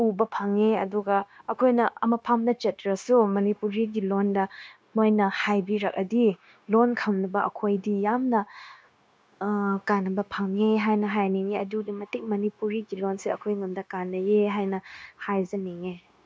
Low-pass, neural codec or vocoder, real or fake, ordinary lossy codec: none; codec, 16 kHz, 0.9 kbps, LongCat-Audio-Codec; fake; none